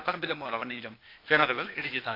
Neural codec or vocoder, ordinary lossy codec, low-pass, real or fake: codec, 16 kHz, 0.8 kbps, ZipCodec; AAC, 32 kbps; 5.4 kHz; fake